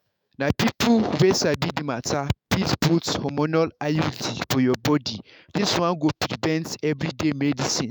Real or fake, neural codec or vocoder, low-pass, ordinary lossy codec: fake; autoencoder, 48 kHz, 128 numbers a frame, DAC-VAE, trained on Japanese speech; none; none